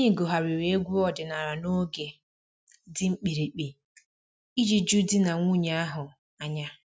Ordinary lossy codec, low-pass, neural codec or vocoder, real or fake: none; none; none; real